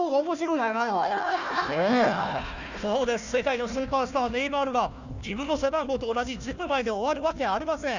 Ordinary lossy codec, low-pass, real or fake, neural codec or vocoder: none; 7.2 kHz; fake; codec, 16 kHz, 1 kbps, FunCodec, trained on Chinese and English, 50 frames a second